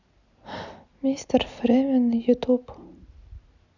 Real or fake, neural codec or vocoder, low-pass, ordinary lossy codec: real; none; 7.2 kHz; none